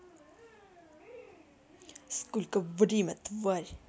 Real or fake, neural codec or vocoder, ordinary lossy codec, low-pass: real; none; none; none